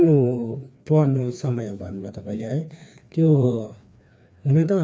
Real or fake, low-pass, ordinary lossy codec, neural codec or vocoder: fake; none; none; codec, 16 kHz, 2 kbps, FreqCodec, larger model